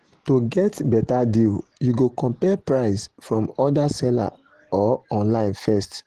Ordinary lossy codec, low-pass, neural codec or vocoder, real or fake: Opus, 16 kbps; 14.4 kHz; none; real